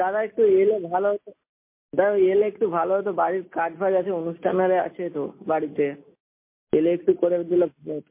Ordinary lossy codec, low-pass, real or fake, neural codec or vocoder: MP3, 24 kbps; 3.6 kHz; real; none